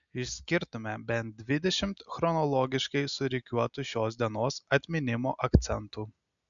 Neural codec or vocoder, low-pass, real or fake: none; 7.2 kHz; real